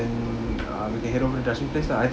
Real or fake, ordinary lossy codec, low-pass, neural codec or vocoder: real; none; none; none